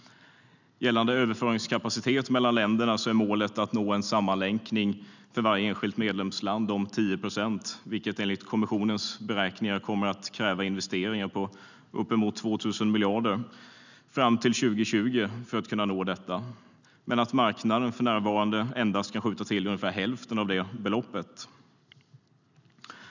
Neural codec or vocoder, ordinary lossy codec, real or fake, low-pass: none; none; real; 7.2 kHz